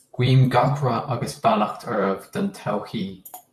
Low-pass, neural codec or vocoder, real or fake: 14.4 kHz; vocoder, 44.1 kHz, 128 mel bands, Pupu-Vocoder; fake